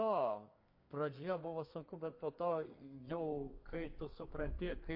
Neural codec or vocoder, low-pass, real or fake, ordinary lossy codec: codec, 32 kHz, 1.9 kbps, SNAC; 5.4 kHz; fake; MP3, 32 kbps